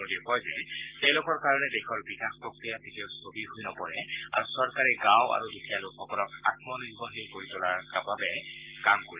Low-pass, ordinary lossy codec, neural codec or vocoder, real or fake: 3.6 kHz; Opus, 32 kbps; none; real